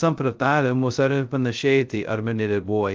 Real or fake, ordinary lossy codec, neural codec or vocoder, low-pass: fake; Opus, 24 kbps; codec, 16 kHz, 0.2 kbps, FocalCodec; 7.2 kHz